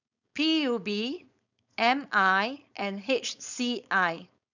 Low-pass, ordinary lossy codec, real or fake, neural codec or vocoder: 7.2 kHz; none; fake; codec, 16 kHz, 4.8 kbps, FACodec